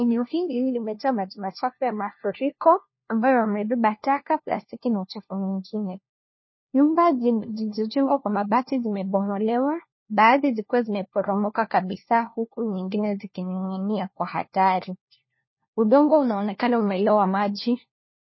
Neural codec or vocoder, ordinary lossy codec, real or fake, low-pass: codec, 16 kHz, 1 kbps, FunCodec, trained on LibriTTS, 50 frames a second; MP3, 24 kbps; fake; 7.2 kHz